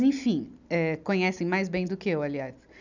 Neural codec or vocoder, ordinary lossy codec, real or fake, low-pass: none; none; real; 7.2 kHz